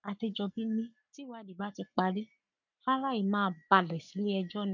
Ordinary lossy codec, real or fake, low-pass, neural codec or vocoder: none; fake; 7.2 kHz; codec, 44.1 kHz, 7.8 kbps, Pupu-Codec